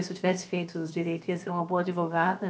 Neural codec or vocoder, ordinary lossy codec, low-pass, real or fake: codec, 16 kHz, about 1 kbps, DyCAST, with the encoder's durations; none; none; fake